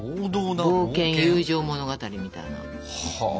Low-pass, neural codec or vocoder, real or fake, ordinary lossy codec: none; none; real; none